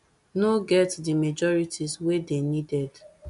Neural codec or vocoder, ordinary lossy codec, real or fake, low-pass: none; none; real; 10.8 kHz